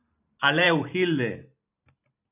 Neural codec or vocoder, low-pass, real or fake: vocoder, 44.1 kHz, 128 mel bands every 512 samples, BigVGAN v2; 3.6 kHz; fake